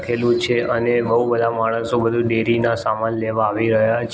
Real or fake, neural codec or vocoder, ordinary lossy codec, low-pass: real; none; none; none